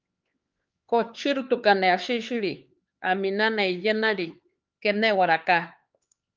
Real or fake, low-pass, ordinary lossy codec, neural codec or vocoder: fake; 7.2 kHz; Opus, 24 kbps; codec, 16 kHz, 4 kbps, X-Codec, HuBERT features, trained on LibriSpeech